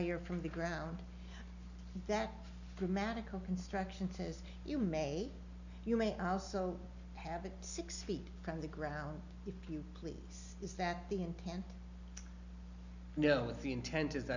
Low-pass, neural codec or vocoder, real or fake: 7.2 kHz; none; real